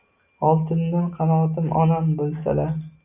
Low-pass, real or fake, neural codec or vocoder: 3.6 kHz; real; none